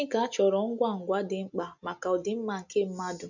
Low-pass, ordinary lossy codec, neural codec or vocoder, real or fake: 7.2 kHz; none; none; real